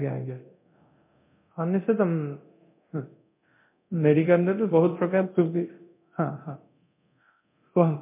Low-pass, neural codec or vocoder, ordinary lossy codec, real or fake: 3.6 kHz; codec, 24 kHz, 0.9 kbps, DualCodec; MP3, 24 kbps; fake